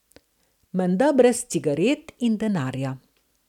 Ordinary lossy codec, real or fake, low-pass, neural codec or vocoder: none; real; 19.8 kHz; none